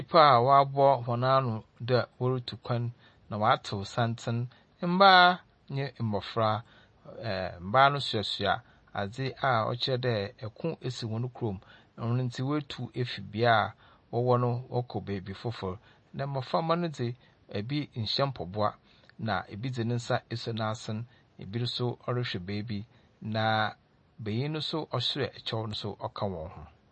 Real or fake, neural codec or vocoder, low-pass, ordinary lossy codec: real; none; 10.8 kHz; MP3, 32 kbps